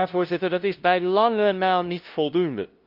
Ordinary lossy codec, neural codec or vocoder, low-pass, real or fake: Opus, 24 kbps; codec, 16 kHz, 0.5 kbps, FunCodec, trained on LibriTTS, 25 frames a second; 5.4 kHz; fake